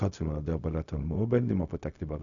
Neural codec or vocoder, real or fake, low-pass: codec, 16 kHz, 0.4 kbps, LongCat-Audio-Codec; fake; 7.2 kHz